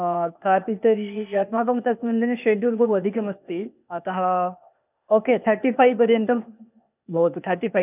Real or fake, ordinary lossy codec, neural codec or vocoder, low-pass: fake; none; codec, 16 kHz, 0.8 kbps, ZipCodec; 3.6 kHz